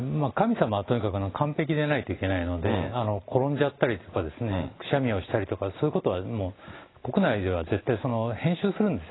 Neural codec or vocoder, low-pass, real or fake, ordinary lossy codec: none; 7.2 kHz; real; AAC, 16 kbps